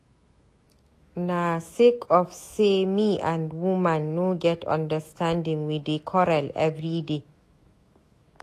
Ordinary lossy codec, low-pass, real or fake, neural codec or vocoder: AAC, 48 kbps; 14.4 kHz; fake; autoencoder, 48 kHz, 128 numbers a frame, DAC-VAE, trained on Japanese speech